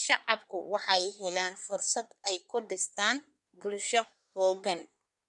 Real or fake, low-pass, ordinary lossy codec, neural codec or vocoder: fake; 10.8 kHz; none; codec, 24 kHz, 1 kbps, SNAC